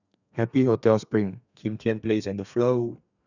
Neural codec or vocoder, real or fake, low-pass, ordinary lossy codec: codec, 32 kHz, 1.9 kbps, SNAC; fake; 7.2 kHz; none